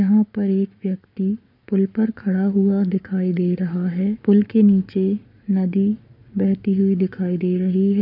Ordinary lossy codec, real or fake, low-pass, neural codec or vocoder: none; fake; 5.4 kHz; codec, 44.1 kHz, 7.8 kbps, Pupu-Codec